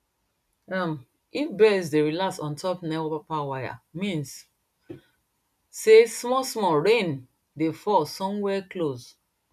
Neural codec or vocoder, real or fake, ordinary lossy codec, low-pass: none; real; none; 14.4 kHz